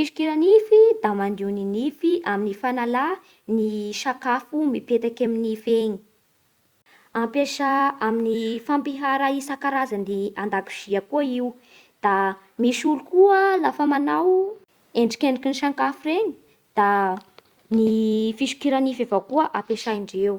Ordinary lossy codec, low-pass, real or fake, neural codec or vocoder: Opus, 64 kbps; 19.8 kHz; fake; vocoder, 44.1 kHz, 128 mel bands every 256 samples, BigVGAN v2